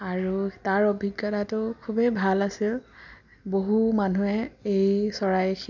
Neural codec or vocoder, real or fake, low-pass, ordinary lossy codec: none; real; 7.2 kHz; none